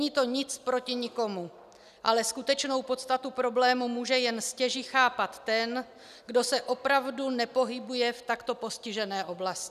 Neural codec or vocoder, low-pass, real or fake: none; 14.4 kHz; real